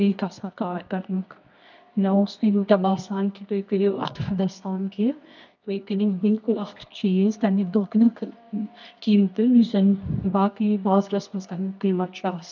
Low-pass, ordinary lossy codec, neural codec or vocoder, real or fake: 7.2 kHz; none; codec, 24 kHz, 0.9 kbps, WavTokenizer, medium music audio release; fake